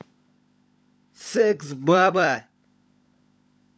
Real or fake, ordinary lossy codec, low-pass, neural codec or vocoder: fake; none; none; codec, 16 kHz, 16 kbps, FunCodec, trained on LibriTTS, 50 frames a second